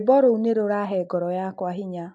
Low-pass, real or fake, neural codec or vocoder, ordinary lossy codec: 10.8 kHz; real; none; AAC, 64 kbps